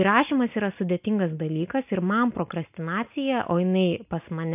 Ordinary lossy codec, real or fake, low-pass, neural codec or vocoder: AAC, 32 kbps; real; 3.6 kHz; none